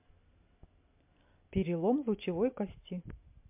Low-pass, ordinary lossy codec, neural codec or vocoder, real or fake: 3.6 kHz; MP3, 32 kbps; none; real